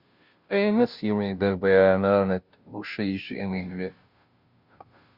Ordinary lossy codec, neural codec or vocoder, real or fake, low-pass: Opus, 64 kbps; codec, 16 kHz, 0.5 kbps, FunCodec, trained on Chinese and English, 25 frames a second; fake; 5.4 kHz